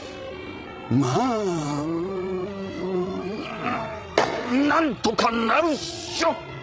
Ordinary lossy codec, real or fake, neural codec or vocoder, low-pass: none; fake; codec, 16 kHz, 16 kbps, FreqCodec, larger model; none